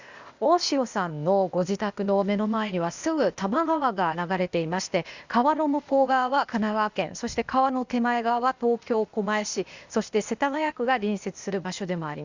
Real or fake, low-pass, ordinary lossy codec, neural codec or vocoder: fake; 7.2 kHz; Opus, 64 kbps; codec, 16 kHz, 0.8 kbps, ZipCodec